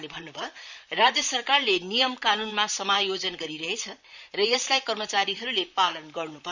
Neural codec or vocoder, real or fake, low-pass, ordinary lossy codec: vocoder, 44.1 kHz, 128 mel bands, Pupu-Vocoder; fake; 7.2 kHz; none